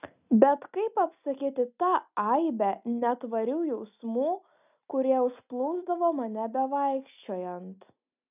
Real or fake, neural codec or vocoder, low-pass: real; none; 3.6 kHz